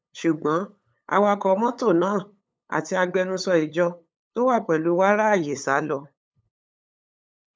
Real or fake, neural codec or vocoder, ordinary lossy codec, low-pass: fake; codec, 16 kHz, 8 kbps, FunCodec, trained on LibriTTS, 25 frames a second; none; none